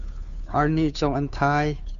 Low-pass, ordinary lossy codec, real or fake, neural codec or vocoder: 7.2 kHz; MP3, 96 kbps; fake; codec, 16 kHz, 2 kbps, FunCodec, trained on Chinese and English, 25 frames a second